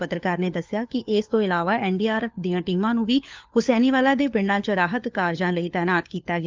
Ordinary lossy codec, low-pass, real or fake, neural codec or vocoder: Opus, 32 kbps; 7.2 kHz; fake; codec, 16 kHz, 4 kbps, FunCodec, trained on LibriTTS, 50 frames a second